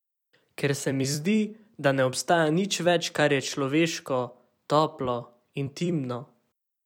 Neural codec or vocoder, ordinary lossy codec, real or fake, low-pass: vocoder, 44.1 kHz, 128 mel bands every 256 samples, BigVGAN v2; none; fake; 19.8 kHz